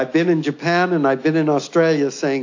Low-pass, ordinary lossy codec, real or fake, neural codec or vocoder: 7.2 kHz; AAC, 48 kbps; real; none